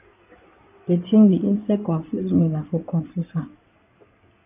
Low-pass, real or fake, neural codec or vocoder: 3.6 kHz; fake; codec, 16 kHz in and 24 kHz out, 2.2 kbps, FireRedTTS-2 codec